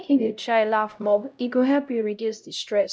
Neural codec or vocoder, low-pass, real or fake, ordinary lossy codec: codec, 16 kHz, 0.5 kbps, X-Codec, HuBERT features, trained on LibriSpeech; none; fake; none